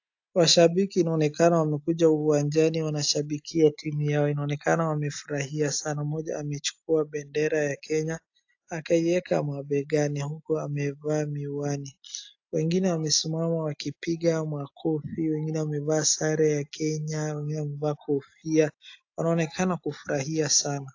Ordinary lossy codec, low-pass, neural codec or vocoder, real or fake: AAC, 48 kbps; 7.2 kHz; none; real